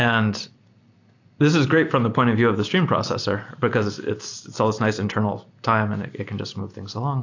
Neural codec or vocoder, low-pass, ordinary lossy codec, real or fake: none; 7.2 kHz; AAC, 48 kbps; real